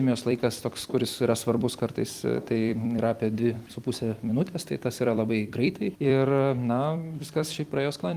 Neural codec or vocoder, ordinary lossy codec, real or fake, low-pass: vocoder, 44.1 kHz, 128 mel bands every 256 samples, BigVGAN v2; Opus, 32 kbps; fake; 14.4 kHz